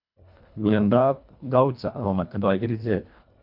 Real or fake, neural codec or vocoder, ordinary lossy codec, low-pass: fake; codec, 24 kHz, 1.5 kbps, HILCodec; none; 5.4 kHz